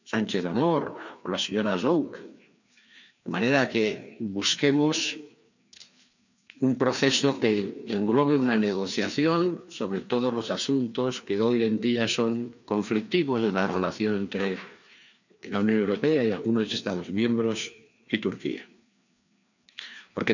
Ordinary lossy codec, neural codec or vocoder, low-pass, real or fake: none; codec, 16 kHz, 2 kbps, FreqCodec, larger model; 7.2 kHz; fake